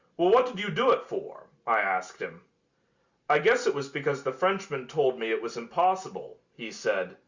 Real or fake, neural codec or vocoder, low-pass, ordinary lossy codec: real; none; 7.2 kHz; Opus, 64 kbps